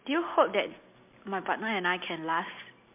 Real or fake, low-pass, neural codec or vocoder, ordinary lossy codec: real; 3.6 kHz; none; MP3, 32 kbps